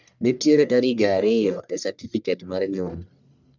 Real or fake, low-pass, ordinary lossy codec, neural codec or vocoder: fake; 7.2 kHz; none; codec, 44.1 kHz, 1.7 kbps, Pupu-Codec